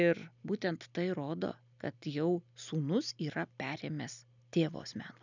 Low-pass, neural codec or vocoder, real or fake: 7.2 kHz; none; real